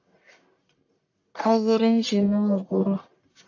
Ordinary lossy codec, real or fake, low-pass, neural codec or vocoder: AAC, 48 kbps; fake; 7.2 kHz; codec, 44.1 kHz, 1.7 kbps, Pupu-Codec